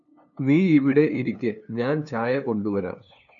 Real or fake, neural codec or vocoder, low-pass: fake; codec, 16 kHz, 2 kbps, FunCodec, trained on LibriTTS, 25 frames a second; 7.2 kHz